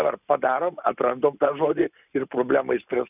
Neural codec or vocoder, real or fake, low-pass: none; real; 3.6 kHz